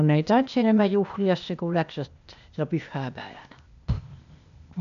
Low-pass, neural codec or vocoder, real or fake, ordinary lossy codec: 7.2 kHz; codec, 16 kHz, 0.8 kbps, ZipCodec; fake; none